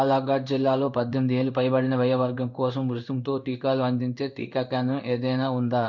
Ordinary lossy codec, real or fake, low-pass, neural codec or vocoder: MP3, 64 kbps; fake; 7.2 kHz; codec, 16 kHz in and 24 kHz out, 1 kbps, XY-Tokenizer